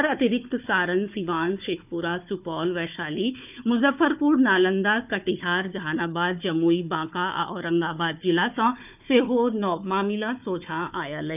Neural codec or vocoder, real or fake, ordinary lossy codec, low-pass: codec, 16 kHz, 4 kbps, FunCodec, trained on Chinese and English, 50 frames a second; fake; none; 3.6 kHz